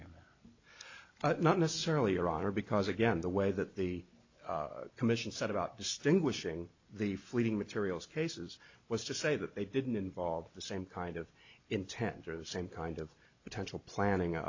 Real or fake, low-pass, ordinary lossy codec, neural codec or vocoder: real; 7.2 kHz; AAC, 48 kbps; none